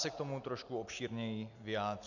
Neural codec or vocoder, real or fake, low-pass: none; real; 7.2 kHz